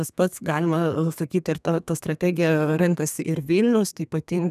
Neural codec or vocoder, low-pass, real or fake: codec, 32 kHz, 1.9 kbps, SNAC; 14.4 kHz; fake